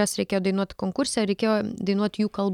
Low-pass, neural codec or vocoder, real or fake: 19.8 kHz; none; real